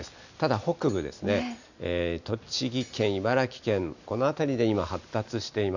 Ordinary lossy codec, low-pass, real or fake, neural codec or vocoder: none; 7.2 kHz; real; none